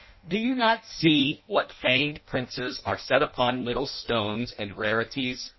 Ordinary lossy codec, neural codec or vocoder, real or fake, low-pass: MP3, 24 kbps; codec, 16 kHz in and 24 kHz out, 0.6 kbps, FireRedTTS-2 codec; fake; 7.2 kHz